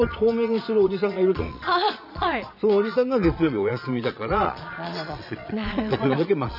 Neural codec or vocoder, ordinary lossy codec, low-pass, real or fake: vocoder, 22.05 kHz, 80 mel bands, Vocos; none; 5.4 kHz; fake